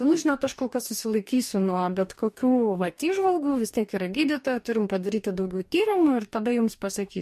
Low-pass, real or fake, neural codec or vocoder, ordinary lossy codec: 14.4 kHz; fake; codec, 44.1 kHz, 2.6 kbps, DAC; MP3, 64 kbps